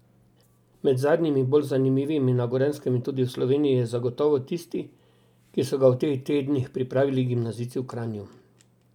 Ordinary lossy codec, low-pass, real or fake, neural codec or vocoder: none; 19.8 kHz; real; none